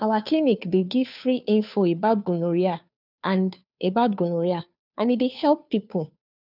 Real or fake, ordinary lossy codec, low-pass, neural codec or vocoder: fake; none; 5.4 kHz; codec, 16 kHz, 2 kbps, FunCodec, trained on Chinese and English, 25 frames a second